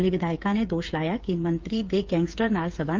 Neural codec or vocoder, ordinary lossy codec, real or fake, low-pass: codec, 16 kHz, 8 kbps, FreqCodec, smaller model; Opus, 24 kbps; fake; 7.2 kHz